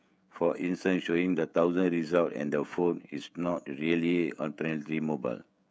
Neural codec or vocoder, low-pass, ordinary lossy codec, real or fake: codec, 16 kHz, 16 kbps, FreqCodec, smaller model; none; none; fake